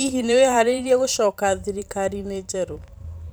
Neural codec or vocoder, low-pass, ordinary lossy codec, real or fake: vocoder, 44.1 kHz, 128 mel bands every 512 samples, BigVGAN v2; none; none; fake